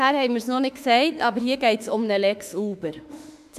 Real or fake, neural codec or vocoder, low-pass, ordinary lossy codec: fake; autoencoder, 48 kHz, 32 numbers a frame, DAC-VAE, trained on Japanese speech; 14.4 kHz; none